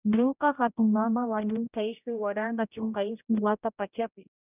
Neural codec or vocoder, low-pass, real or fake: codec, 16 kHz, 0.5 kbps, X-Codec, HuBERT features, trained on general audio; 3.6 kHz; fake